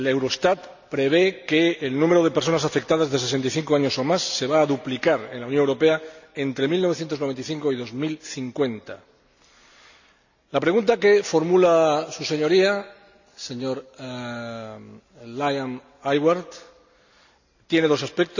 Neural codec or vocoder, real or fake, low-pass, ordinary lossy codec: none; real; 7.2 kHz; none